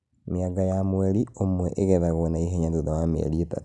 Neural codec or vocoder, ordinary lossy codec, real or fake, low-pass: none; none; real; 10.8 kHz